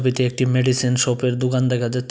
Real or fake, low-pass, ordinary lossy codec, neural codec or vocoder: real; none; none; none